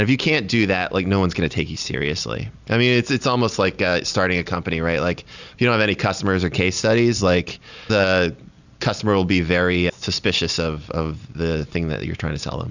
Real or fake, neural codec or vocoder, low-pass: real; none; 7.2 kHz